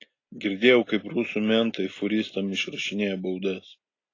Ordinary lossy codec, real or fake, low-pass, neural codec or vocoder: AAC, 32 kbps; real; 7.2 kHz; none